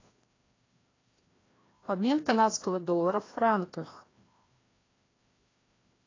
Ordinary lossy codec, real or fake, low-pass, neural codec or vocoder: AAC, 32 kbps; fake; 7.2 kHz; codec, 16 kHz, 1 kbps, FreqCodec, larger model